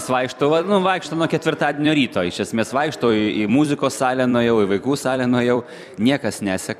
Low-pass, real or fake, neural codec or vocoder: 14.4 kHz; fake; vocoder, 44.1 kHz, 128 mel bands every 256 samples, BigVGAN v2